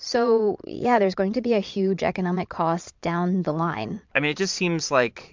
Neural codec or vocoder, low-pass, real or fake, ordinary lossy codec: vocoder, 22.05 kHz, 80 mel bands, Vocos; 7.2 kHz; fake; MP3, 64 kbps